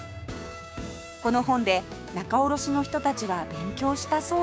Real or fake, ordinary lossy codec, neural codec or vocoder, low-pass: fake; none; codec, 16 kHz, 6 kbps, DAC; none